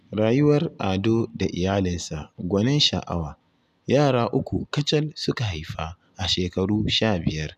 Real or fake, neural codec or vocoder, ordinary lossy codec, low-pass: real; none; none; 14.4 kHz